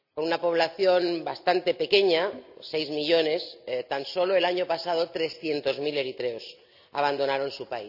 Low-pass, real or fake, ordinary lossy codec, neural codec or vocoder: 5.4 kHz; real; none; none